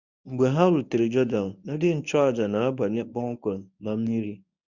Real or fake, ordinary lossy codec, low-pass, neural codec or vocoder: fake; none; 7.2 kHz; codec, 24 kHz, 0.9 kbps, WavTokenizer, medium speech release version 1